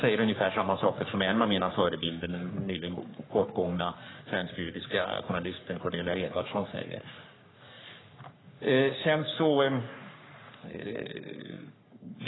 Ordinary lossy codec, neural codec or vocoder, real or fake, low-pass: AAC, 16 kbps; codec, 44.1 kHz, 3.4 kbps, Pupu-Codec; fake; 7.2 kHz